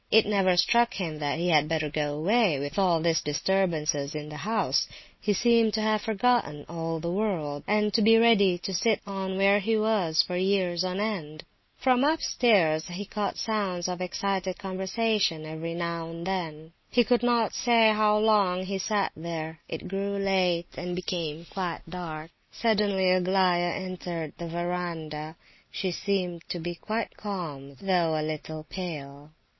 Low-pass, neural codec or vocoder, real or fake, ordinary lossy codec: 7.2 kHz; none; real; MP3, 24 kbps